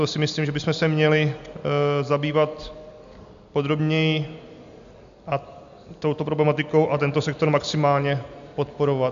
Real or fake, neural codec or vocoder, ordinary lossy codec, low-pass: real; none; MP3, 64 kbps; 7.2 kHz